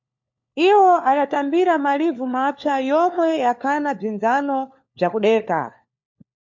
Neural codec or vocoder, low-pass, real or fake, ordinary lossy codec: codec, 16 kHz, 4 kbps, FunCodec, trained on LibriTTS, 50 frames a second; 7.2 kHz; fake; MP3, 48 kbps